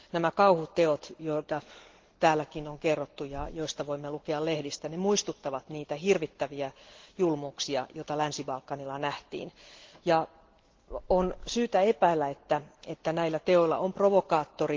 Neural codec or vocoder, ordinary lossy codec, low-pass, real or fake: none; Opus, 16 kbps; 7.2 kHz; real